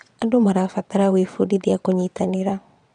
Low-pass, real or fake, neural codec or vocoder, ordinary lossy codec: 9.9 kHz; fake; vocoder, 22.05 kHz, 80 mel bands, WaveNeXt; none